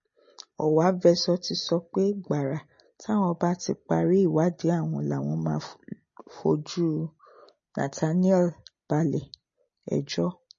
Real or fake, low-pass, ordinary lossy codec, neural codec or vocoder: real; 7.2 kHz; MP3, 32 kbps; none